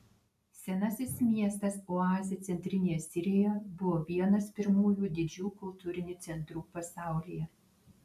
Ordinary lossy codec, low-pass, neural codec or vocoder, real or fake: AAC, 96 kbps; 14.4 kHz; none; real